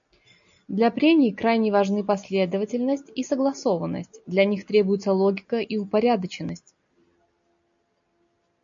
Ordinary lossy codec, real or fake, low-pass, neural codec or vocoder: MP3, 64 kbps; real; 7.2 kHz; none